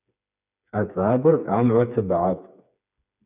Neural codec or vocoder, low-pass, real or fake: codec, 16 kHz, 4 kbps, FreqCodec, smaller model; 3.6 kHz; fake